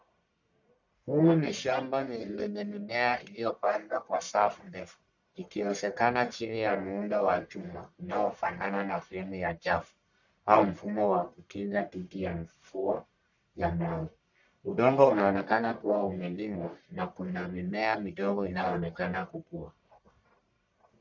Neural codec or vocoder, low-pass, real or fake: codec, 44.1 kHz, 1.7 kbps, Pupu-Codec; 7.2 kHz; fake